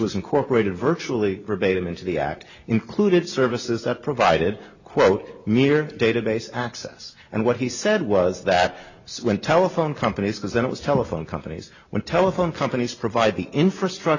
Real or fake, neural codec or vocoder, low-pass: real; none; 7.2 kHz